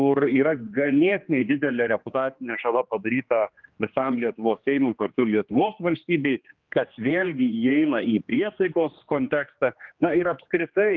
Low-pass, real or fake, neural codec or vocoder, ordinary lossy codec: 7.2 kHz; fake; codec, 16 kHz, 2 kbps, X-Codec, HuBERT features, trained on general audio; Opus, 32 kbps